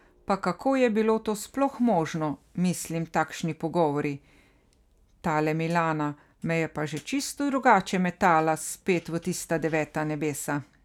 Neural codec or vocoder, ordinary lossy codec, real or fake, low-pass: none; none; real; 19.8 kHz